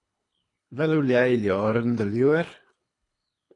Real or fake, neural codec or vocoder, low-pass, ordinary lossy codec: fake; codec, 24 kHz, 3 kbps, HILCodec; 10.8 kHz; AAC, 48 kbps